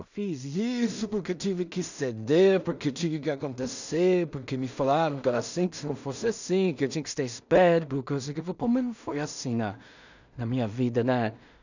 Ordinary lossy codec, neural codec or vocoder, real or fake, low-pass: none; codec, 16 kHz in and 24 kHz out, 0.4 kbps, LongCat-Audio-Codec, two codebook decoder; fake; 7.2 kHz